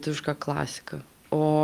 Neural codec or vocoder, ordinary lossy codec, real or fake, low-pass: none; Opus, 32 kbps; real; 14.4 kHz